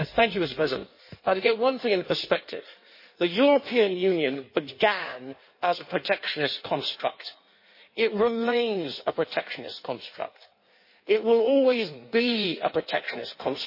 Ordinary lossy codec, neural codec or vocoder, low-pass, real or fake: MP3, 24 kbps; codec, 16 kHz in and 24 kHz out, 1.1 kbps, FireRedTTS-2 codec; 5.4 kHz; fake